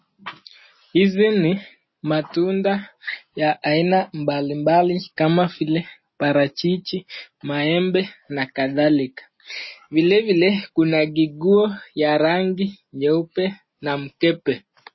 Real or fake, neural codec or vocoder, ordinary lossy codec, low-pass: real; none; MP3, 24 kbps; 7.2 kHz